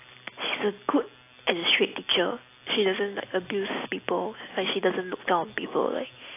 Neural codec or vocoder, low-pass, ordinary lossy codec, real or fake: none; 3.6 kHz; AAC, 24 kbps; real